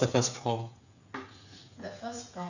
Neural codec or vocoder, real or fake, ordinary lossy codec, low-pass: codec, 16 kHz, 8 kbps, FreqCodec, smaller model; fake; none; 7.2 kHz